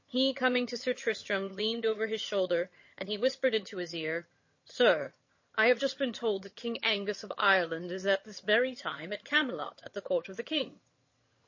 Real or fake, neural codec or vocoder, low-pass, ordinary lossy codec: fake; vocoder, 22.05 kHz, 80 mel bands, HiFi-GAN; 7.2 kHz; MP3, 32 kbps